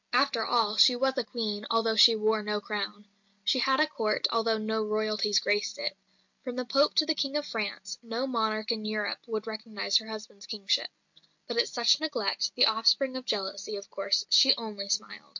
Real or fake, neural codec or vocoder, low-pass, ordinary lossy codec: real; none; 7.2 kHz; MP3, 48 kbps